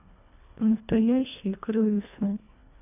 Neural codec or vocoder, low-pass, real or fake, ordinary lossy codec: codec, 24 kHz, 1.5 kbps, HILCodec; 3.6 kHz; fake; none